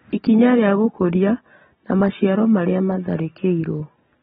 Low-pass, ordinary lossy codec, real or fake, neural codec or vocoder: 7.2 kHz; AAC, 16 kbps; real; none